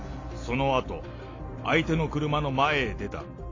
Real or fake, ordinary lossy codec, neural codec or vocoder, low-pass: real; AAC, 32 kbps; none; 7.2 kHz